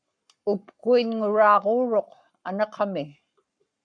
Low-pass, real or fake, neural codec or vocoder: 9.9 kHz; fake; codec, 44.1 kHz, 7.8 kbps, Pupu-Codec